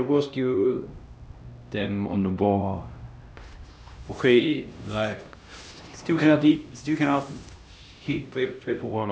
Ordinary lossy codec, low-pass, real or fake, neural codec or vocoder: none; none; fake; codec, 16 kHz, 1 kbps, X-Codec, HuBERT features, trained on LibriSpeech